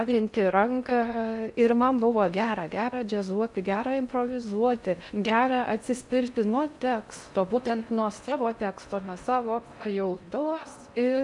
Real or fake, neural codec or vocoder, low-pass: fake; codec, 16 kHz in and 24 kHz out, 0.6 kbps, FocalCodec, streaming, 4096 codes; 10.8 kHz